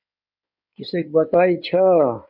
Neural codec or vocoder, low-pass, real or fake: codec, 16 kHz in and 24 kHz out, 2.2 kbps, FireRedTTS-2 codec; 5.4 kHz; fake